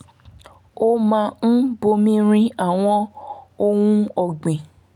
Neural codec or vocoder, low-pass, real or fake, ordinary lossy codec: none; 19.8 kHz; real; none